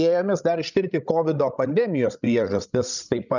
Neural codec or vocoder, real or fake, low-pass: codec, 16 kHz, 16 kbps, FreqCodec, larger model; fake; 7.2 kHz